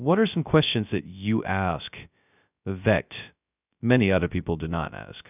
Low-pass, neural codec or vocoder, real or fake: 3.6 kHz; codec, 16 kHz, 0.2 kbps, FocalCodec; fake